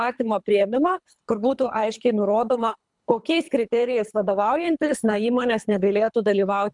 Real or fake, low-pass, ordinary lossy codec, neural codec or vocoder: fake; 10.8 kHz; MP3, 96 kbps; codec, 24 kHz, 3 kbps, HILCodec